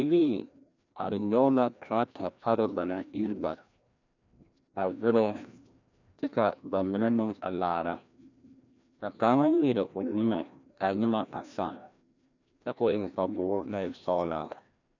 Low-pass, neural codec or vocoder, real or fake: 7.2 kHz; codec, 16 kHz, 1 kbps, FreqCodec, larger model; fake